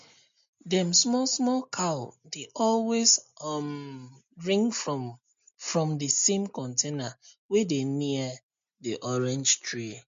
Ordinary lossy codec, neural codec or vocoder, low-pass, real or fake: MP3, 48 kbps; none; 7.2 kHz; real